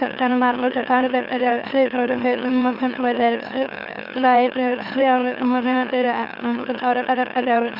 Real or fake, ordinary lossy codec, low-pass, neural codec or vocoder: fake; none; 5.4 kHz; autoencoder, 44.1 kHz, a latent of 192 numbers a frame, MeloTTS